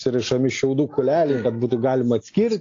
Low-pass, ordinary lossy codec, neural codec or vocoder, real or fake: 7.2 kHz; AAC, 48 kbps; none; real